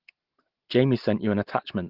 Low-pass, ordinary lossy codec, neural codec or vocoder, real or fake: 5.4 kHz; Opus, 16 kbps; none; real